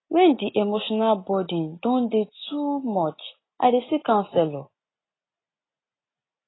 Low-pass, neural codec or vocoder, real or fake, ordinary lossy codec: 7.2 kHz; none; real; AAC, 16 kbps